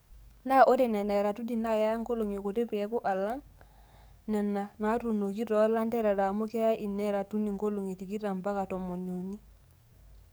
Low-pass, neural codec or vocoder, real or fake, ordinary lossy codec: none; codec, 44.1 kHz, 7.8 kbps, DAC; fake; none